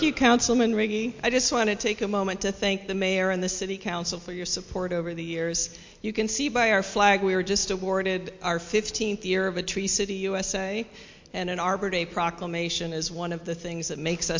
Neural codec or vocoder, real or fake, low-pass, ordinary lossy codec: none; real; 7.2 kHz; MP3, 48 kbps